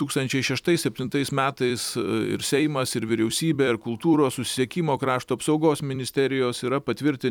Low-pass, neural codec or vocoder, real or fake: 19.8 kHz; vocoder, 44.1 kHz, 128 mel bands every 256 samples, BigVGAN v2; fake